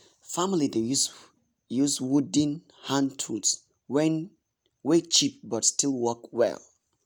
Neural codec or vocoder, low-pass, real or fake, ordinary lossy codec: none; none; real; none